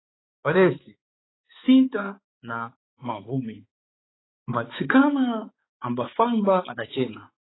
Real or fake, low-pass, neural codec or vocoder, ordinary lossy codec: fake; 7.2 kHz; codec, 16 kHz, 4 kbps, X-Codec, HuBERT features, trained on balanced general audio; AAC, 16 kbps